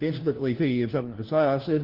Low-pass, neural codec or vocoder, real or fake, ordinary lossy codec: 5.4 kHz; codec, 16 kHz, 1 kbps, FunCodec, trained on LibriTTS, 50 frames a second; fake; Opus, 16 kbps